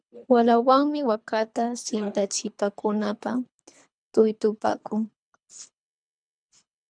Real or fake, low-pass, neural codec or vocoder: fake; 9.9 kHz; codec, 24 kHz, 3 kbps, HILCodec